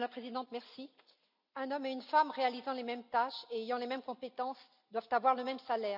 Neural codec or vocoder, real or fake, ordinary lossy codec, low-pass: none; real; none; 5.4 kHz